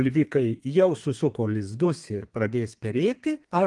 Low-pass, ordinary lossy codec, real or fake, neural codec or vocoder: 10.8 kHz; Opus, 24 kbps; fake; codec, 32 kHz, 1.9 kbps, SNAC